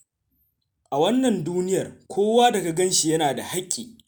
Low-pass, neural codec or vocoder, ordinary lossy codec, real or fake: none; none; none; real